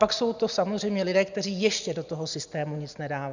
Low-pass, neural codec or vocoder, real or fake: 7.2 kHz; none; real